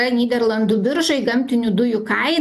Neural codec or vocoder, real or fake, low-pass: none; real; 14.4 kHz